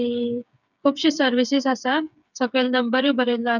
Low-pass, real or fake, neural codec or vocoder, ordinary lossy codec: 7.2 kHz; fake; codec, 16 kHz, 8 kbps, FreqCodec, smaller model; none